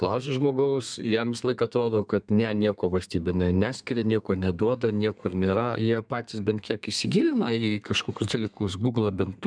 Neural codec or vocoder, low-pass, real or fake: codec, 32 kHz, 1.9 kbps, SNAC; 9.9 kHz; fake